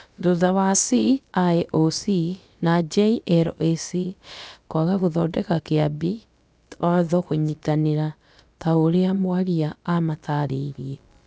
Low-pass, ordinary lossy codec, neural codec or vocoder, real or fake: none; none; codec, 16 kHz, about 1 kbps, DyCAST, with the encoder's durations; fake